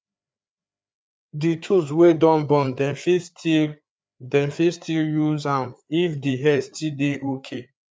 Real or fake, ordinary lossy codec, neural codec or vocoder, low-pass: fake; none; codec, 16 kHz, 4 kbps, FreqCodec, larger model; none